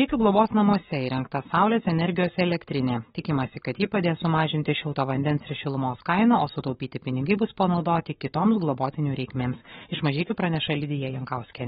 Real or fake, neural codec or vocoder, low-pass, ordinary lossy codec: fake; codec, 44.1 kHz, 7.8 kbps, Pupu-Codec; 19.8 kHz; AAC, 16 kbps